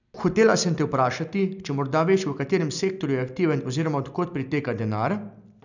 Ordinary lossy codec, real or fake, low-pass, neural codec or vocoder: none; real; 7.2 kHz; none